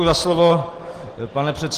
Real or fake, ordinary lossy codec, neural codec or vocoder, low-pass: real; Opus, 16 kbps; none; 14.4 kHz